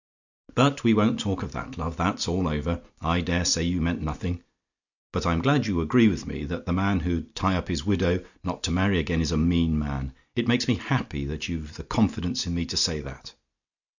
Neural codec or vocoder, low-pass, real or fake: none; 7.2 kHz; real